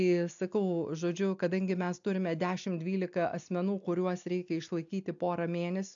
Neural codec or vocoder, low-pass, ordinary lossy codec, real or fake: none; 7.2 kHz; AAC, 64 kbps; real